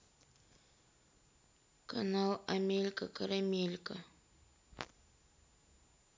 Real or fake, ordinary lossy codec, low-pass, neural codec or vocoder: real; none; none; none